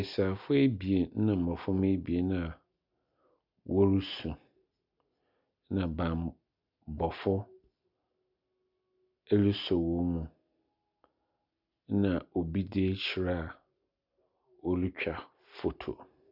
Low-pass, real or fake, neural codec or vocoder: 5.4 kHz; real; none